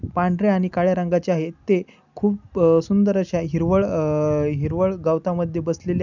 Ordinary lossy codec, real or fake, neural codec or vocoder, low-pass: none; real; none; 7.2 kHz